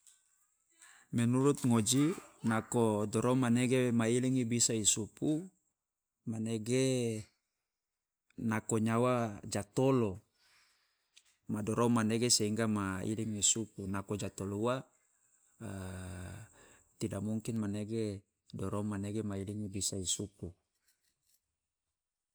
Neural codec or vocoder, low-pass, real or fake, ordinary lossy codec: none; none; real; none